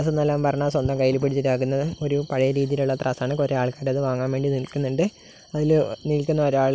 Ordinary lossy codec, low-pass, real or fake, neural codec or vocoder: none; none; real; none